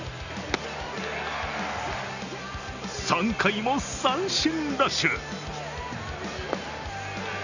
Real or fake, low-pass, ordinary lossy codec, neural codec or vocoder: real; 7.2 kHz; none; none